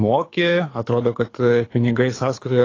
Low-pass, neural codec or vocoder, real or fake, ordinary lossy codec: 7.2 kHz; codec, 24 kHz, 6 kbps, HILCodec; fake; AAC, 32 kbps